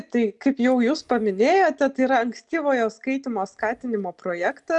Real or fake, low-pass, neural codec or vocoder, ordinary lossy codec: real; 10.8 kHz; none; MP3, 96 kbps